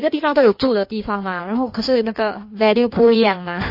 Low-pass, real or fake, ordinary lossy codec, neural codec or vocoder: 5.4 kHz; fake; MP3, 32 kbps; codec, 16 kHz in and 24 kHz out, 1.1 kbps, FireRedTTS-2 codec